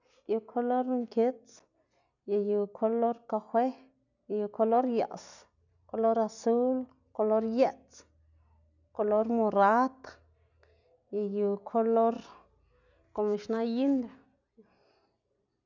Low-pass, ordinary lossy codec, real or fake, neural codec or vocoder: 7.2 kHz; none; real; none